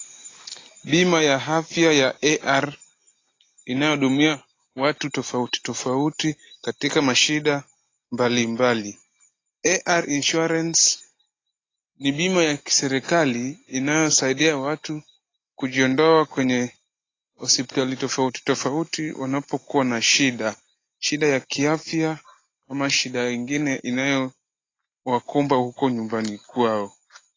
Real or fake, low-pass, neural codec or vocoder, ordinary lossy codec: real; 7.2 kHz; none; AAC, 32 kbps